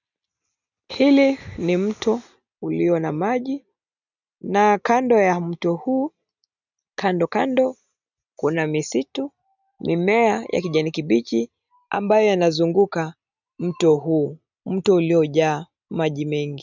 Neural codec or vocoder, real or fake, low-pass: none; real; 7.2 kHz